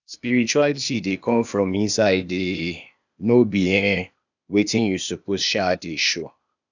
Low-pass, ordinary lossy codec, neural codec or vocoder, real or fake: 7.2 kHz; none; codec, 16 kHz, 0.8 kbps, ZipCodec; fake